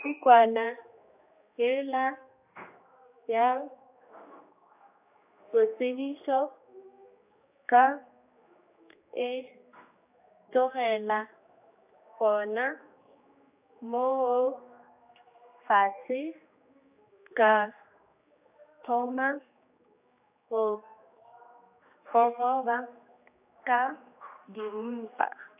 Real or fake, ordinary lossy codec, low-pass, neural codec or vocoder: fake; AAC, 32 kbps; 3.6 kHz; codec, 16 kHz, 2 kbps, X-Codec, HuBERT features, trained on general audio